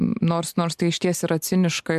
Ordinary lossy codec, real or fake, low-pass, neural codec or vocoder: MP3, 96 kbps; real; 14.4 kHz; none